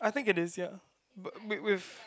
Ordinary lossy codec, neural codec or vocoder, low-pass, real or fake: none; none; none; real